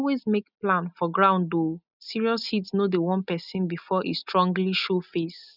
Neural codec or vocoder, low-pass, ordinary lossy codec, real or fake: none; 5.4 kHz; none; real